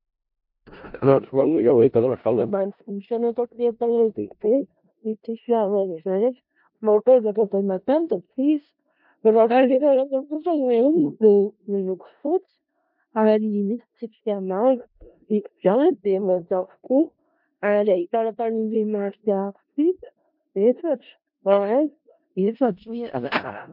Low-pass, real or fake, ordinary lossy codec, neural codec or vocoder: 5.4 kHz; fake; MP3, 48 kbps; codec, 16 kHz in and 24 kHz out, 0.4 kbps, LongCat-Audio-Codec, four codebook decoder